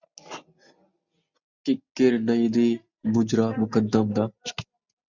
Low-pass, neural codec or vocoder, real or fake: 7.2 kHz; none; real